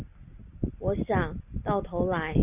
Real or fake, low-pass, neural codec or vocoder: real; 3.6 kHz; none